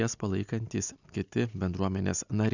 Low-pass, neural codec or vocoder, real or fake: 7.2 kHz; none; real